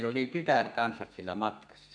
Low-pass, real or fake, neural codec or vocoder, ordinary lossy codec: 9.9 kHz; fake; codec, 32 kHz, 1.9 kbps, SNAC; none